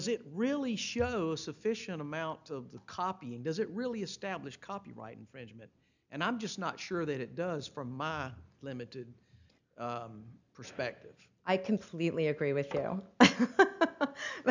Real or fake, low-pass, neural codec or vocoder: real; 7.2 kHz; none